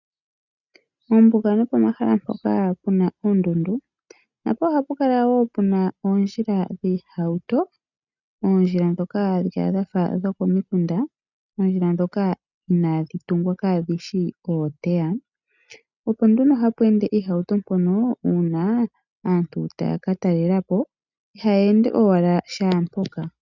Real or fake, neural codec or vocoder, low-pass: real; none; 7.2 kHz